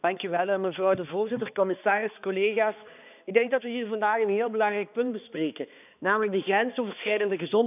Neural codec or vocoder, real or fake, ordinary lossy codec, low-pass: codec, 16 kHz, 2 kbps, X-Codec, HuBERT features, trained on balanced general audio; fake; none; 3.6 kHz